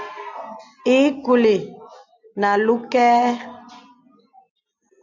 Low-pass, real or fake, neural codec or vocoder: 7.2 kHz; real; none